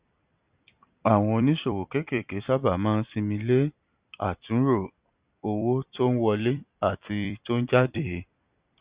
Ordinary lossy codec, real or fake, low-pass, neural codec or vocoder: Opus, 64 kbps; fake; 3.6 kHz; vocoder, 44.1 kHz, 128 mel bands every 256 samples, BigVGAN v2